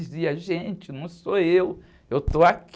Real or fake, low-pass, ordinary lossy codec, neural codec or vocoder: real; none; none; none